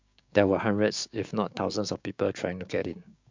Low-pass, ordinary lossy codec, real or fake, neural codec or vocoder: 7.2 kHz; MP3, 64 kbps; fake; codec, 16 kHz, 6 kbps, DAC